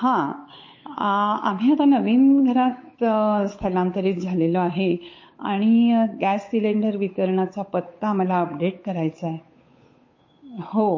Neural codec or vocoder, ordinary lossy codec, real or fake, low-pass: codec, 16 kHz, 8 kbps, FunCodec, trained on Chinese and English, 25 frames a second; MP3, 32 kbps; fake; 7.2 kHz